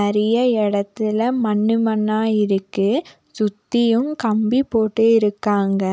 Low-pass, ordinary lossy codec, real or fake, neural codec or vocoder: none; none; real; none